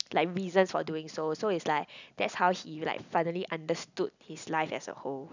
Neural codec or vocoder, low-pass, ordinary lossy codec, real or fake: none; 7.2 kHz; none; real